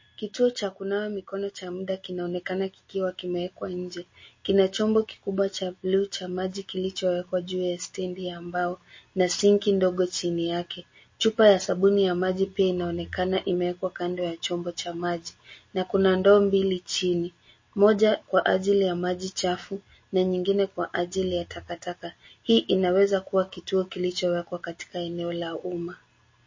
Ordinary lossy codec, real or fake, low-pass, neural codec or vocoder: MP3, 32 kbps; real; 7.2 kHz; none